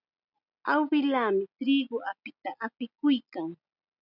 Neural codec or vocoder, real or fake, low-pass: none; real; 5.4 kHz